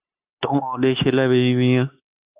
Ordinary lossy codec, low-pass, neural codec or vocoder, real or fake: Opus, 64 kbps; 3.6 kHz; codec, 16 kHz, 0.9 kbps, LongCat-Audio-Codec; fake